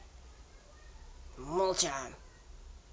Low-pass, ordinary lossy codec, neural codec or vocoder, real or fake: none; none; none; real